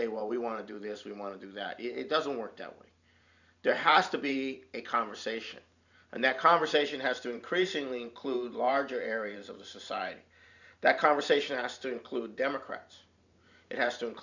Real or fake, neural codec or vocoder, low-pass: fake; vocoder, 44.1 kHz, 128 mel bands every 512 samples, BigVGAN v2; 7.2 kHz